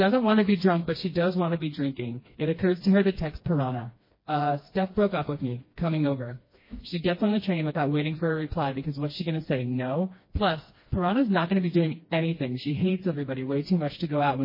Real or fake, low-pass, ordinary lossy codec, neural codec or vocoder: fake; 5.4 kHz; MP3, 24 kbps; codec, 16 kHz, 2 kbps, FreqCodec, smaller model